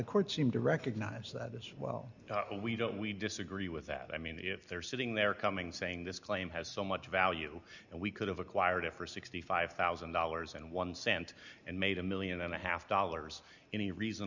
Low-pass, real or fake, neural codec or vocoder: 7.2 kHz; real; none